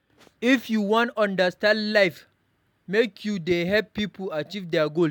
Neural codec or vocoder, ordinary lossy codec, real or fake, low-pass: none; none; real; 19.8 kHz